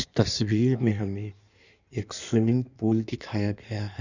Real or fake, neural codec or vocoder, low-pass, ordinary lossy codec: fake; codec, 16 kHz in and 24 kHz out, 1.1 kbps, FireRedTTS-2 codec; 7.2 kHz; none